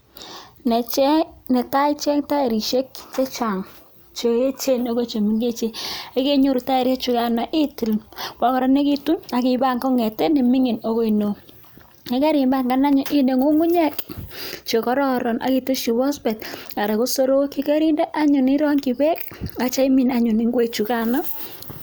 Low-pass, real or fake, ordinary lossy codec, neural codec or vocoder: none; real; none; none